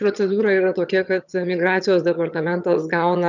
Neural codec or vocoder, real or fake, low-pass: vocoder, 22.05 kHz, 80 mel bands, HiFi-GAN; fake; 7.2 kHz